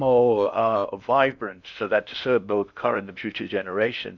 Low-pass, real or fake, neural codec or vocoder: 7.2 kHz; fake; codec, 16 kHz in and 24 kHz out, 0.6 kbps, FocalCodec, streaming, 2048 codes